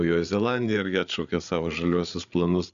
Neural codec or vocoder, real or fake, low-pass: codec, 16 kHz, 8 kbps, FreqCodec, larger model; fake; 7.2 kHz